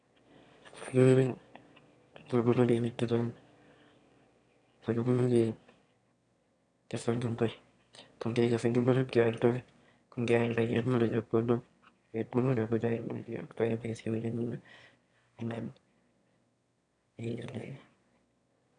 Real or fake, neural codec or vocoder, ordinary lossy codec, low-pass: fake; autoencoder, 22.05 kHz, a latent of 192 numbers a frame, VITS, trained on one speaker; none; 9.9 kHz